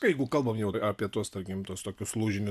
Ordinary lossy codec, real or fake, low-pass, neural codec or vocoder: AAC, 96 kbps; real; 14.4 kHz; none